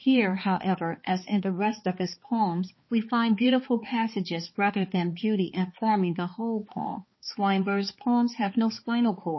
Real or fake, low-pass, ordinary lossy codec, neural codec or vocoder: fake; 7.2 kHz; MP3, 24 kbps; codec, 16 kHz, 2 kbps, X-Codec, HuBERT features, trained on balanced general audio